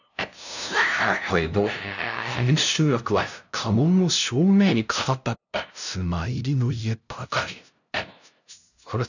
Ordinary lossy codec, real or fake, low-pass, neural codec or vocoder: none; fake; 7.2 kHz; codec, 16 kHz, 0.5 kbps, FunCodec, trained on LibriTTS, 25 frames a second